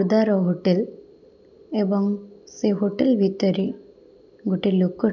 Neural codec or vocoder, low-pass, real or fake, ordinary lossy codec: none; 7.2 kHz; real; none